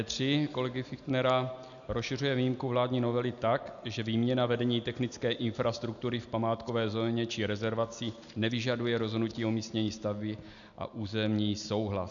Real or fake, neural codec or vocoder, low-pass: real; none; 7.2 kHz